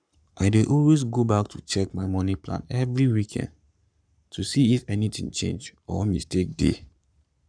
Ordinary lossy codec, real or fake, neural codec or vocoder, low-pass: none; fake; codec, 44.1 kHz, 7.8 kbps, Pupu-Codec; 9.9 kHz